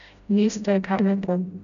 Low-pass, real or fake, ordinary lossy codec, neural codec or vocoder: 7.2 kHz; fake; none; codec, 16 kHz, 0.5 kbps, FreqCodec, smaller model